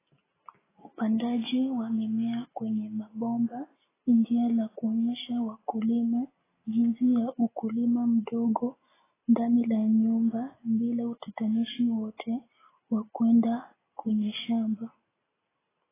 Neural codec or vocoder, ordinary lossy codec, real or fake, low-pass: none; AAC, 16 kbps; real; 3.6 kHz